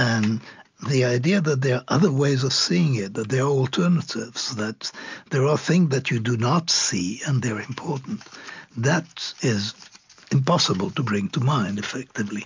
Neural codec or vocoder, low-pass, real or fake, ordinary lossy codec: none; 7.2 kHz; real; MP3, 64 kbps